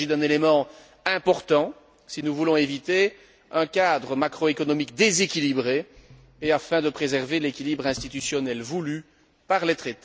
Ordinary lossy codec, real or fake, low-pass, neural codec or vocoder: none; real; none; none